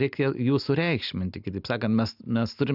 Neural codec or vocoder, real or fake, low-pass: none; real; 5.4 kHz